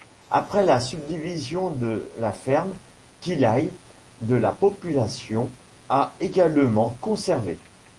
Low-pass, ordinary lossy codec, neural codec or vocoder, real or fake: 10.8 kHz; Opus, 24 kbps; vocoder, 48 kHz, 128 mel bands, Vocos; fake